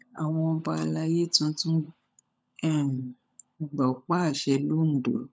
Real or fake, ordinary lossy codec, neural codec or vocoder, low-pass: fake; none; codec, 16 kHz, 16 kbps, FunCodec, trained on LibriTTS, 50 frames a second; none